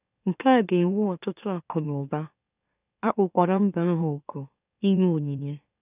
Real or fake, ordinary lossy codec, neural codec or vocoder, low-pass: fake; none; autoencoder, 44.1 kHz, a latent of 192 numbers a frame, MeloTTS; 3.6 kHz